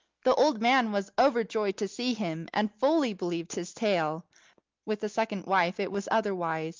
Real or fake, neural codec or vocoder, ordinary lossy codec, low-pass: real; none; Opus, 24 kbps; 7.2 kHz